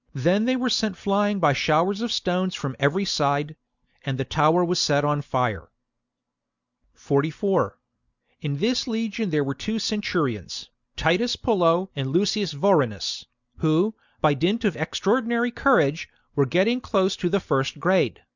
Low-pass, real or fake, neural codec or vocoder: 7.2 kHz; real; none